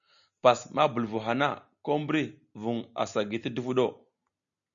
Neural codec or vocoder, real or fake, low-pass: none; real; 7.2 kHz